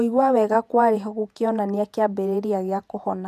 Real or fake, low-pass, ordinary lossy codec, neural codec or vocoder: fake; 14.4 kHz; none; vocoder, 48 kHz, 128 mel bands, Vocos